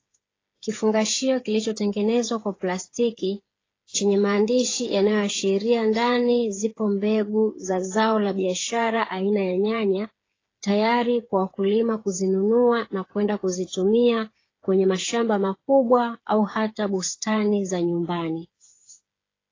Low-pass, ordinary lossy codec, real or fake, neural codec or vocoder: 7.2 kHz; AAC, 32 kbps; fake; codec, 16 kHz, 8 kbps, FreqCodec, smaller model